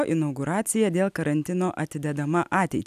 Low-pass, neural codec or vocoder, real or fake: 14.4 kHz; none; real